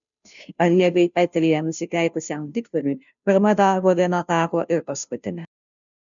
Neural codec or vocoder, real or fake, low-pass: codec, 16 kHz, 0.5 kbps, FunCodec, trained on Chinese and English, 25 frames a second; fake; 7.2 kHz